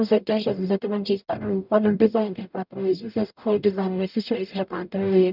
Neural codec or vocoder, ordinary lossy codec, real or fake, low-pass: codec, 44.1 kHz, 0.9 kbps, DAC; none; fake; 5.4 kHz